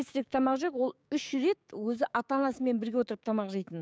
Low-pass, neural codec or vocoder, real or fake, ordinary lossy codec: none; codec, 16 kHz, 6 kbps, DAC; fake; none